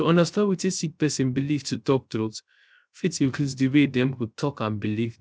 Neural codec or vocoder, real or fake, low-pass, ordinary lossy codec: codec, 16 kHz, 0.3 kbps, FocalCodec; fake; none; none